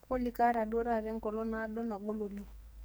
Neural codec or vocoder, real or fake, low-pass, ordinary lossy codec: codec, 44.1 kHz, 2.6 kbps, SNAC; fake; none; none